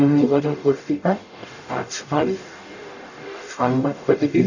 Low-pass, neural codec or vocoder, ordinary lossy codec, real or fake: 7.2 kHz; codec, 44.1 kHz, 0.9 kbps, DAC; none; fake